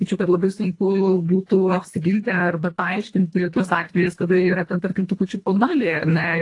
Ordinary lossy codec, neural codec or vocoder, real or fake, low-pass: AAC, 48 kbps; codec, 24 kHz, 1.5 kbps, HILCodec; fake; 10.8 kHz